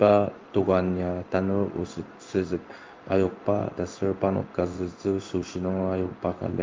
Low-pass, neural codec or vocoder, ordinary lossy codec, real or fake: 7.2 kHz; codec, 16 kHz in and 24 kHz out, 1 kbps, XY-Tokenizer; Opus, 32 kbps; fake